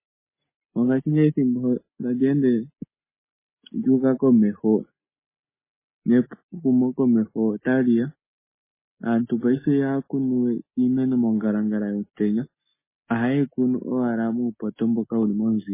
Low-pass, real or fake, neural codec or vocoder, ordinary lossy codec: 3.6 kHz; real; none; MP3, 16 kbps